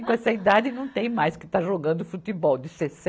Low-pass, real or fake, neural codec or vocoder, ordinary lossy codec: none; real; none; none